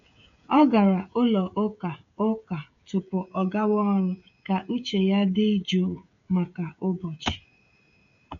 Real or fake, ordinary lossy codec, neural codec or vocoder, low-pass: fake; AAC, 48 kbps; codec, 16 kHz, 16 kbps, FreqCodec, smaller model; 7.2 kHz